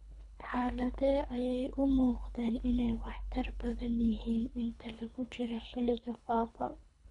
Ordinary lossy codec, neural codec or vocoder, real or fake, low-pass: none; codec, 24 kHz, 3 kbps, HILCodec; fake; 10.8 kHz